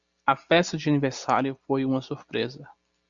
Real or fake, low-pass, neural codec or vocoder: real; 7.2 kHz; none